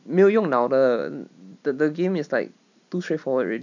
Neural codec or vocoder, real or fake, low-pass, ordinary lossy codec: none; real; 7.2 kHz; none